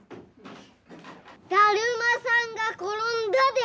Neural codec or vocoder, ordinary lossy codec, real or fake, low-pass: none; none; real; none